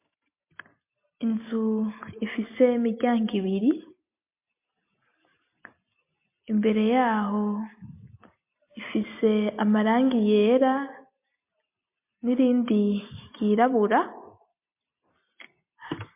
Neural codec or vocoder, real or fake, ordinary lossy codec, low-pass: none; real; MP3, 24 kbps; 3.6 kHz